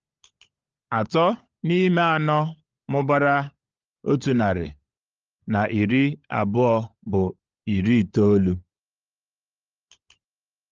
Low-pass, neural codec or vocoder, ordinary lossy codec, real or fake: 7.2 kHz; codec, 16 kHz, 16 kbps, FunCodec, trained on LibriTTS, 50 frames a second; Opus, 24 kbps; fake